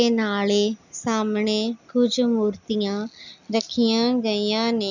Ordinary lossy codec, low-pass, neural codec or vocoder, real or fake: none; 7.2 kHz; none; real